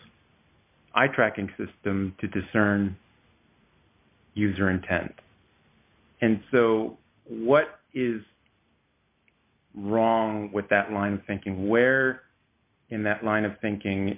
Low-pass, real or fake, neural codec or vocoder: 3.6 kHz; real; none